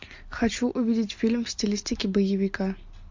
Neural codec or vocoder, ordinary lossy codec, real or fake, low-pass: none; MP3, 48 kbps; real; 7.2 kHz